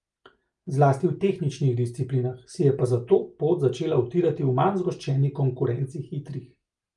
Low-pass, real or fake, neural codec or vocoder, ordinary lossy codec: 10.8 kHz; real; none; Opus, 32 kbps